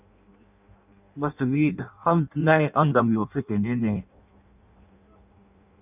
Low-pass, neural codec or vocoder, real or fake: 3.6 kHz; codec, 16 kHz in and 24 kHz out, 0.6 kbps, FireRedTTS-2 codec; fake